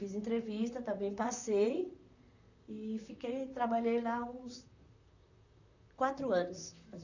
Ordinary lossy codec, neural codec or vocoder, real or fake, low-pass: none; vocoder, 44.1 kHz, 128 mel bands, Pupu-Vocoder; fake; 7.2 kHz